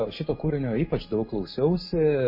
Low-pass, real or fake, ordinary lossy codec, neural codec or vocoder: 5.4 kHz; real; MP3, 24 kbps; none